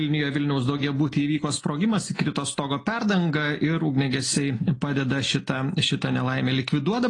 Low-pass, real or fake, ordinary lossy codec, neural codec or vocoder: 10.8 kHz; real; AAC, 32 kbps; none